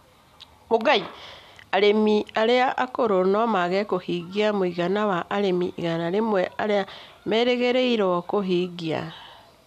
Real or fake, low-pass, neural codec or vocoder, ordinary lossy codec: real; 14.4 kHz; none; none